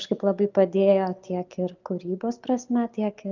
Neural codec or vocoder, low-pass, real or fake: none; 7.2 kHz; real